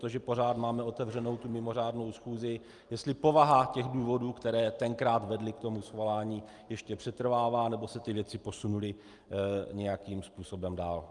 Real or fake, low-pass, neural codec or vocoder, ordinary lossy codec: real; 10.8 kHz; none; Opus, 32 kbps